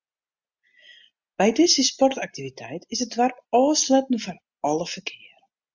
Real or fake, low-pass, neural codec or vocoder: real; 7.2 kHz; none